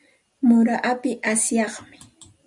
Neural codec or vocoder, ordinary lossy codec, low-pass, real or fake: none; Opus, 64 kbps; 10.8 kHz; real